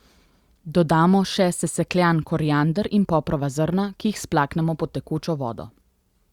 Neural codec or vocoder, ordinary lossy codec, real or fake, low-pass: none; Opus, 64 kbps; real; 19.8 kHz